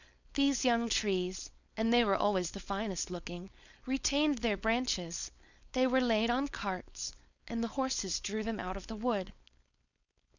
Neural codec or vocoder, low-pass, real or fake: codec, 16 kHz, 4.8 kbps, FACodec; 7.2 kHz; fake